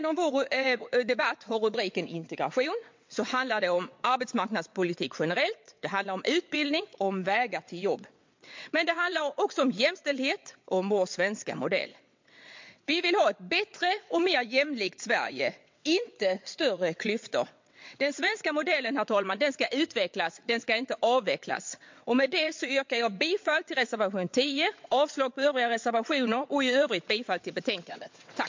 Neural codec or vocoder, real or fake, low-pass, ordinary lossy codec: vocoder, 22.05 kHz, 80 mel bands, WaveNeXt; fake; 7.2 kHz; MP3, 48 kbps